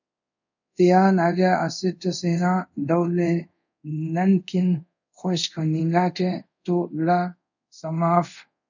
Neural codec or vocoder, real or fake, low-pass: codec, 24 kHz, 0.5 kbps, DualCodec; fake; 7.2 kHz